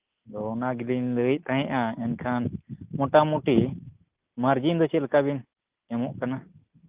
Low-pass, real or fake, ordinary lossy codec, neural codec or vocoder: 3.6 kHz; real; Opus, 32 kbps; none